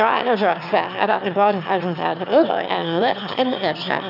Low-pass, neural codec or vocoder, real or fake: 5.4 kHz; autoencoder, 22.05 kHz, a latent of 192 numbers a frame, VITS, trained on one speaker; fake